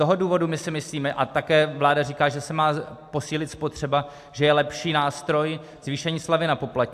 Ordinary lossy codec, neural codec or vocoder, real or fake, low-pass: AAC, 96 kbps; none; real; 14.4 kHz